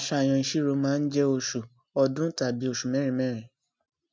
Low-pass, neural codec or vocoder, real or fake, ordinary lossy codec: none; none; real; none